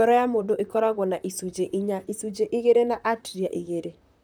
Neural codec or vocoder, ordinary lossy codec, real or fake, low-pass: vocoder, 44.1 kHz, 128 mel bands, Pupu-Vocoder; none; fake; none